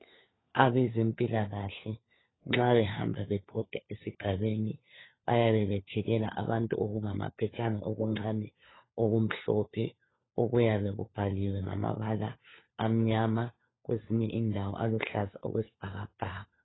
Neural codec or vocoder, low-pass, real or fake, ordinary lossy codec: codec, 16 kHz, 4 kbps, FunCodec, trained on LibriTTS, 50 frames a second; 7.2 kHz; fake; AAC, 16 kbps